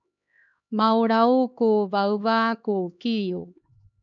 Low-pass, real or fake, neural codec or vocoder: 7.2 kHz; fake; codec, 16 kHz, 2 kbps, X-Codec, HuBERT features, trained on LibriSpeech